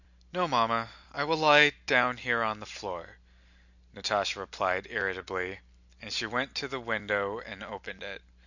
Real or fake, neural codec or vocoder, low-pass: real; none; 7.2 kHz